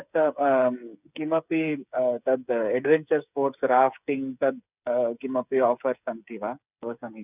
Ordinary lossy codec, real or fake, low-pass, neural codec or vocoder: none; fake; 3.6 kHz; codec, 16 kHz, 8 kbps, FreqCodec, smaller model